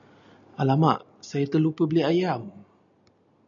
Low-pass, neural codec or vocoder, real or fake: 7.2 kHz; none; real